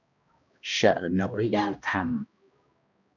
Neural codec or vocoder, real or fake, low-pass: codec, 16 kHz, 1 kbps, X-Codec, HuBERT features, trained on balanced general audio; fake; 7.2 kHz